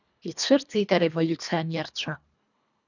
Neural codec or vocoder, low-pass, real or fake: codec, 24 kHz, 1.5 kbps, HILCodec; 7.2 kHz; fake